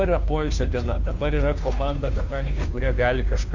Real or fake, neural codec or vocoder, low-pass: fake; codec, 16 kHz, 2 kbps, FunCodec, trained on Chinese and English, 25 frames a second; 7.2 kHz